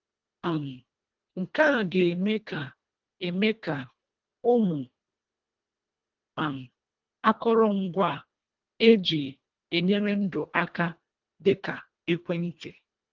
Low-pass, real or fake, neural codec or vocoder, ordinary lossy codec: 7.2 kHz; fake; codec, 24 kHz, 1.5 kbps, HILCodec; Opus, 32 kbps